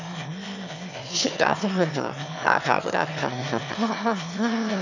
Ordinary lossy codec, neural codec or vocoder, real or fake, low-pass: none; autoencoder, 22.05 kHz, a latent of 192 numbers a frame, VITS, trained on one speaker; fake; 7.2 kHz